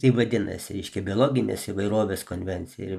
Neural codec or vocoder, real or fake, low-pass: none; real; 14.4 kHz